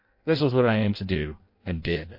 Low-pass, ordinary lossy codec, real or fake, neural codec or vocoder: 5.4 kHz; MP3, 32 kbps; fake; codec, 16 kHz in and 24 kHz out, 0.6 kbps, FireRedTTS-2 codec